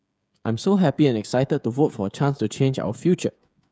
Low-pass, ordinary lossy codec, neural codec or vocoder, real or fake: none; none; codec, 16 kHz, 16 kbps, FreqCodec, smaller model; fake